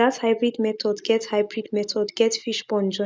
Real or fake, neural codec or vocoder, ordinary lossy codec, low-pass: real; none; none; none